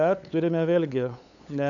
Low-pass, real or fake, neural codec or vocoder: 7.2 kHz; fake; codec, 16 kHz, 8 kbps, FunCodec, trained on LibriTTS, 25 frames a second